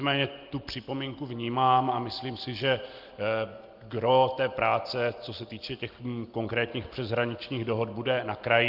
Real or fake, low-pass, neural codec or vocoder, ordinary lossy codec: real; 5.4 kHz; none; Opus, 32 kbps